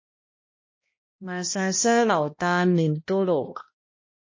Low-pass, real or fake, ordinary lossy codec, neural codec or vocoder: 7.2 kHz; fake; MP3, 32 kbps; codec, 16 kHz, 1 kbps, X-Codec, HuBERT features, trained on balanced general audio